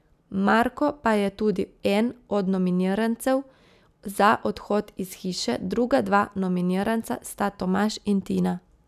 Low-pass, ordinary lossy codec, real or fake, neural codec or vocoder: 14.4 kHz; none; real; none